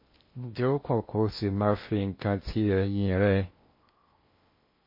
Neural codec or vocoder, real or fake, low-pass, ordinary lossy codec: codec, 16 kHz in and 24 kHz out, 0.6 kbps, FocalCodec, streaming, 2048 codes; fake; 5.4 kHz; MP3, 24 kbps